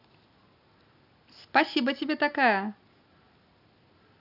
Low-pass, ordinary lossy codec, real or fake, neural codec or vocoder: 5.4 kHz; none; real; none